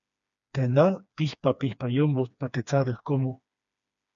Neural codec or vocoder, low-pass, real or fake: codec, 16 kHz, 2 kbps, FreqCodec, smaller model; 7.2 kHz; fake